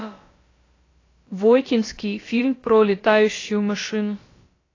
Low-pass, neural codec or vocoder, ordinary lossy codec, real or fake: 7.2 kHz; codec, 16 kHz, about 1 kbps, DyCAST, with the encoder's durations; AAC, 32 kbps; fake